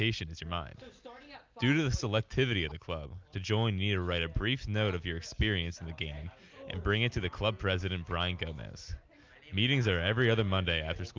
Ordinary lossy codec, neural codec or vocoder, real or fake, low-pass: Opus, 32 kbps; none; real; 7.2 kHz